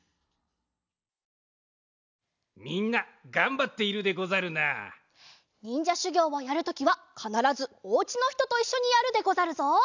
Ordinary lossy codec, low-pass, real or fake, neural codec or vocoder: none; 7.2 kHz; real; none